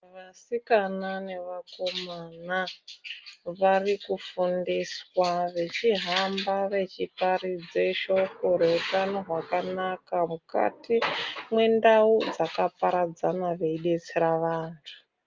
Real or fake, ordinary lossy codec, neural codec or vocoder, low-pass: real; Opus, 24 kbps; none; 7.2 kHz